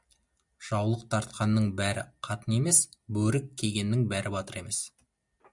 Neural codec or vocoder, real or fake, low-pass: none; real; 10.8 kHz